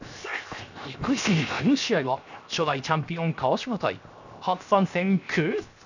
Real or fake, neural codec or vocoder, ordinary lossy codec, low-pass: fake; codec, 16 kHz, 0.7 kbps, FocalCodec; none; 7.2 kHz